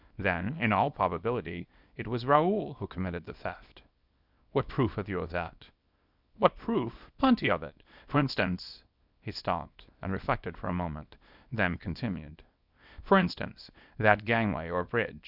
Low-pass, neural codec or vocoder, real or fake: 5.4 kHz; codec, 24 kHz, 0.9 kbps, WavTokenizer, small release; fake